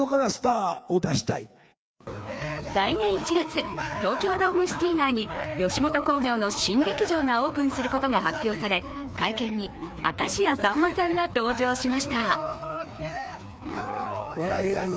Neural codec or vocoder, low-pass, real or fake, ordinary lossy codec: codec, 16 kHz, 2 kbps, FreqCodec, larger model; none; fake; none